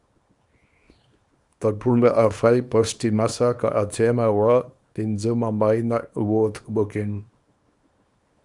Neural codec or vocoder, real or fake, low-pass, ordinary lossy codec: codec, 24 kHz, 0.9 kbps, WavTokenizer, small release; fake; 10.8 kHz; Opus, 64 kbps